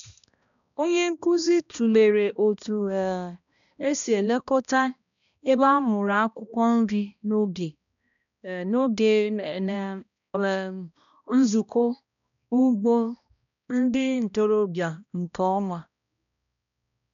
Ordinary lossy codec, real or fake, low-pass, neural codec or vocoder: MP3, 96 kbps; fake; 7.2 kHz; codec, 16 kHz, 1 kbps, X-Codec, HuBERT features, trained on balanced general audio